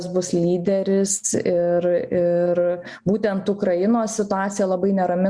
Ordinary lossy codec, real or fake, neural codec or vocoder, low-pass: Opus, 64 kbps; real; none; 9.9 kHz